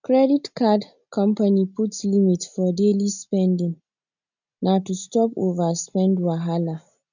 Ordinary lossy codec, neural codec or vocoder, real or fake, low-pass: none; none; real; 7.2 kHz